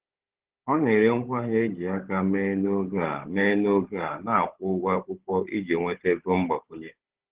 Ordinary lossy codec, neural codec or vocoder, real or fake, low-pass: Opus, 16 kbps; codec, 16 kHz, 16 kbps, FunCodec, trained on Chinese and English, 50 frames a second; fake; 3.6 kHz